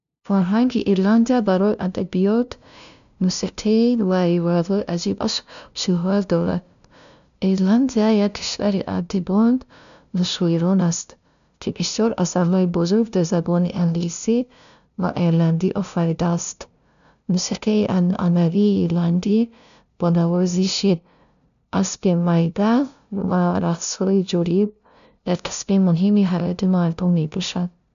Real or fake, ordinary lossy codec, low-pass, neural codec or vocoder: fake; none; 7.2 kHz; codec, 16 kHz, 0.5 kbps, FunCodec, trained on LibriTTS, 25 frames a second